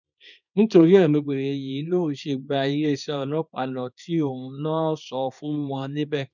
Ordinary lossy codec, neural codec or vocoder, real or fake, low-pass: none; codec, 24 kHz, 0.9 kbps, WavTokenizer, small release; fake; 7.2 kHz